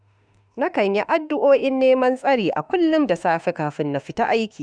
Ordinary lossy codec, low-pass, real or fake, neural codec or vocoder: none; 9.9 kHz; fake; autoencoder, 48 kHz, 32 numbers a frame, DAC-VAE, trained on Japanese speech